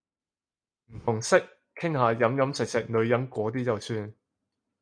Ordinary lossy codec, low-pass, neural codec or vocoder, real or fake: MP3, 96 kbps; 9.9 kHz; none; real